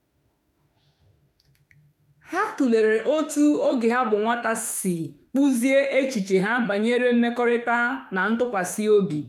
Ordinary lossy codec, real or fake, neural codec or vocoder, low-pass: none; fake; autoencoder, 48 kHz, 32 numbers a frame, DAC-VAE, trained on Japanese speech; 19.8 kHz